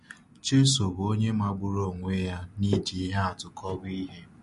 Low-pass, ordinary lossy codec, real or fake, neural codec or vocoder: 14.4 kHz; MP3, 48 kbps; real; none